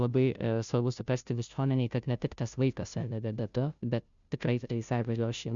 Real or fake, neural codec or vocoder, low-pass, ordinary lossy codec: fake; codec, 16 kHz, 0.5 kbps, FunCodec, trained on Chinese and English, 25 frames a second; 7.2 kHz; Opus, 64 kbps